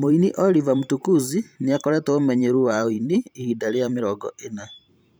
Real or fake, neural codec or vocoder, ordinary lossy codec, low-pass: fake; vocoder, 44.1 kHz, 128 mel bands every 512 samples, BigVGAN v2; none; none